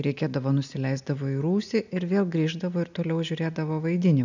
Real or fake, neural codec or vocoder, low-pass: real; none; 7.2 kHz